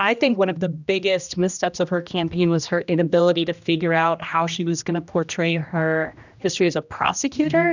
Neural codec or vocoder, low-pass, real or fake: codec, 16 kHz, 1 kbps, X-Codec, HuBERT features, trained on general audio; 7.2 kHz; fake